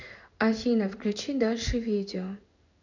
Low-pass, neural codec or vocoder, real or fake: 7.2 kHz; codec, 16 kHz in and 24 kHz out, 1 kbps, XY-Tokenizer; fake